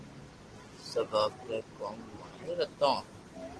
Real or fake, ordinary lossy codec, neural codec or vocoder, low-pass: real; Opus, 16 kbps; none; 10.8 kHz